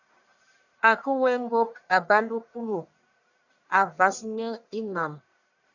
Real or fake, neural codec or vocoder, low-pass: fake; codec, 44.1 kHz, 1.7 kbps, Pupu-Codec; 7.2 kHz